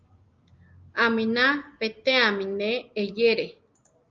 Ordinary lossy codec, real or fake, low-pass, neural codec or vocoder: Opus, 32 kbps; real; 7.2 kHz; none